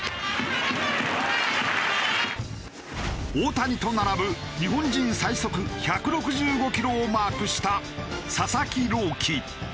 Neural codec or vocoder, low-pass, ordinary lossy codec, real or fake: none; none; none; real